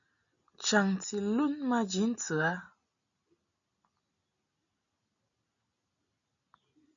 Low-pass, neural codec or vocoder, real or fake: 7.2 kHz; none; real